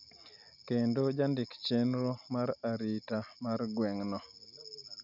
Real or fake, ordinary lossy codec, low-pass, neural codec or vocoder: real; none; 5.4 kHz; none